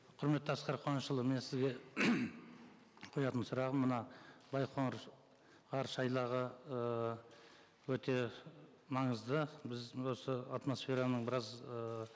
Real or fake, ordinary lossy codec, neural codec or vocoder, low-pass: real; none; none; none